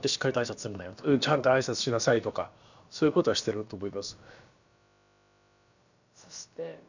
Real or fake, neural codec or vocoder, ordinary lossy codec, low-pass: fake; codec, 16 kHz, about 1 kbps, DyCAST, with the encoder's durations; none; 7.2 kHz